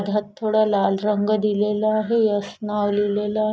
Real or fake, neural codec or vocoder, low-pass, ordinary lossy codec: real; none; none; none